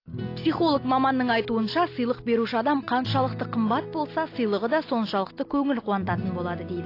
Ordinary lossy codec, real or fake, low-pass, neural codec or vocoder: AAC, 32 kbps; real; 5.4 kHz; none